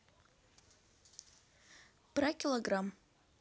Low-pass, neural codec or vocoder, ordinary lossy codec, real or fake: none; none; none; real